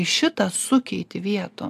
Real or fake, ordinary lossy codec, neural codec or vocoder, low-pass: real; AAC, 96 kbps; none; 14.4 kHz